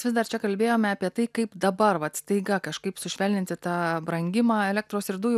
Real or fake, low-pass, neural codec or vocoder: real; 14.4 kHz; none